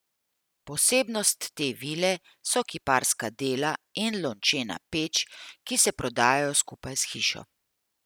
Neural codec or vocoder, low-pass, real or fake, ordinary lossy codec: none; none; real; none